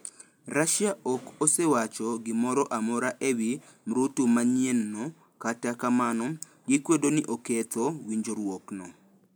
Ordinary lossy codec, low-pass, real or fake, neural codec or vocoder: none; none; real; none